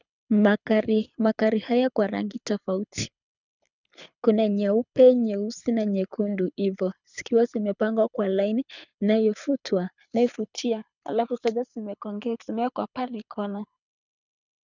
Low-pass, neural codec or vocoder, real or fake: 7.2 kHz; codec, 24 kHz, 6 kbps, HILCodec; fake